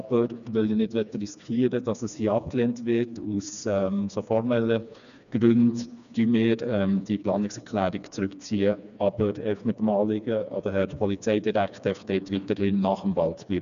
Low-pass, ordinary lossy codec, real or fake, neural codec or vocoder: 7.2 kHz; none; fake; codec, 16 kHz, 2 kbps, FreqCodec, smaller model